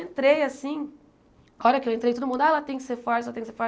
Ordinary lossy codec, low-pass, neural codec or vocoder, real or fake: none; none; none; real